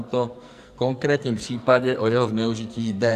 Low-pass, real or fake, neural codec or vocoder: 14.4 kHz; fake; codec, 44.1 kHz, 2.6 kbps, SNAC